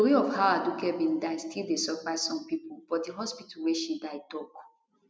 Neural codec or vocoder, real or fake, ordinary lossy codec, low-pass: none; real; none; none